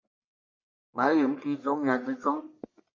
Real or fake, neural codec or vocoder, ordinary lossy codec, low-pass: fake; codec, 44.1 kHz, 1.7 kbps, Pupu-Codec; MP3, 32 kbps; 7.2 kHz